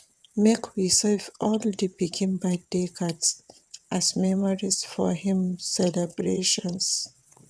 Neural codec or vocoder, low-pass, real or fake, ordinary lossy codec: vocoder, 22.05 kHz, 80 mel bands, WaveNeXt; none; fake; none